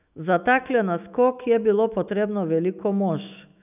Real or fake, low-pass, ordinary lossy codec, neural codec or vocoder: fake; 3.6 kHz; none; autoencoder, 48 kHz, 128 numbers a frame, DAC-VAE, trained on Japanese speech